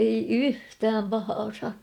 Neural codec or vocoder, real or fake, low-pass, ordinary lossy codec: none; real; 19.8 kHz; none